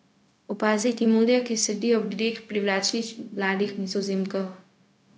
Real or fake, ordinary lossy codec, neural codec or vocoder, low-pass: fake; none; codec, 16 kHz, 0.4 kbps, LongCat-Audio-Codec; none